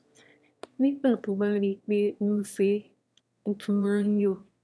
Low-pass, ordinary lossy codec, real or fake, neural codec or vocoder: none; none; fake; autoencoder, 22.05 kHz, a latent of 192 numbers a frame, VITS, trained on one speaker